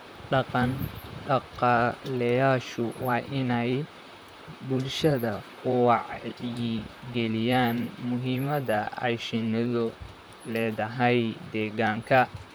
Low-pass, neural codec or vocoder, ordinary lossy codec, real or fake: none; vocoder, 44.1 kHz, 128 mel bands, Pupu-Vocoder; none; fake